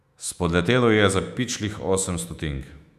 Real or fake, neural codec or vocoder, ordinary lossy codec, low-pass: fake; autoencoder, 48 kHz, 128 numbers a frame, DAC-VAE, trained on Japanese speech; none; 14.4 kHz